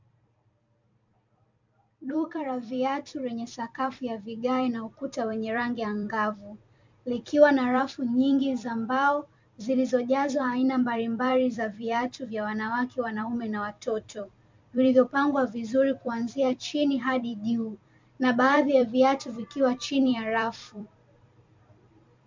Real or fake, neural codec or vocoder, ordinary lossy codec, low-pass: fake; vocoder, 44.1 kHz, 128 mel bands every 256 samples, BigVGAN v2; MP3, 64 kbps; 7.2 kHz